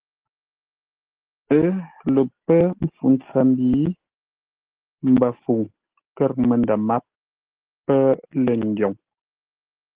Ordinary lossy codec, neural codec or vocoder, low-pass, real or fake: Opus, 16 kbps; none; 3.6 kHz; real